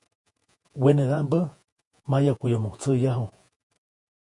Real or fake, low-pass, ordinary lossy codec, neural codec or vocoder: fake; 10.8 kHz; MP3, 64 kbps; vocoder, 48 kHz, 128 mel bands, Vocos